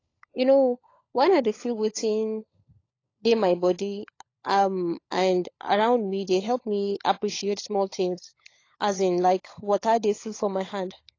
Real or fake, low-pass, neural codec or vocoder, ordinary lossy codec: fake; 7.2 kHz; codec, 16 kHz, 16 kbps, FunCodec, trained on LibriTTS, 50 frames a second; AAC, 32 kbps